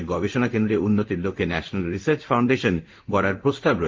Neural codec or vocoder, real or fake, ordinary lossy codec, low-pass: codec, 16 kHz in and 24 kHz out, 1 kbps, XY-Tokenizer; fake; Opus, 32 kbps; 7.2 kHz